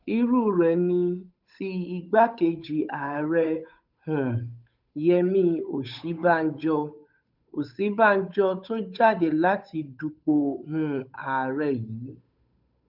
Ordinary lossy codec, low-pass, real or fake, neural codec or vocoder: none; 5.4 kHz; fake; codec, 16 kHz, 8 kbps, FunCodec, trained on Chinese and English, 25 frames a second